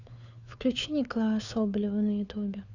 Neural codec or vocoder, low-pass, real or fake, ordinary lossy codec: codec, 16 kHz, 4 kbps, FunCodec, trained on LibriTTS, 50 frames a second; 7.2 kHz; fake; none